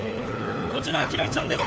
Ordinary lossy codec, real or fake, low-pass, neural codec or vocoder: none; fake; none; codec, 16 kHz, 4 kbps, FunCodec, trained on LibriTTS, 50 frames a second